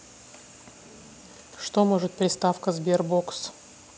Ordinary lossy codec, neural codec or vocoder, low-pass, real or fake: none; none; none; real